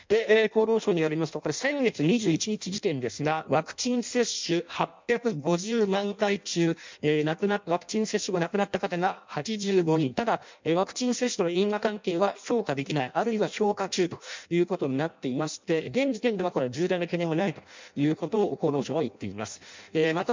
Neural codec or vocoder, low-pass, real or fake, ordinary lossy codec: codec, 16 kHz in and 24 kHz out, 0.6 kbps, FireRedTTS-2 codec; 7.2 kHz; fake; MP3, 64 kbps